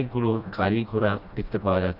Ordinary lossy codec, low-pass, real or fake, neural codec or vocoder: none; 5.4 kHz; fake; codec, 16 kHz, 1 kbps, FreqCodec, smaller model